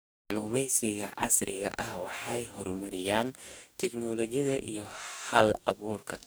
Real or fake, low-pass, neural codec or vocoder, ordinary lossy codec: fake; none; codec, 44.1 kHz, 2.6 kbps, DAC; none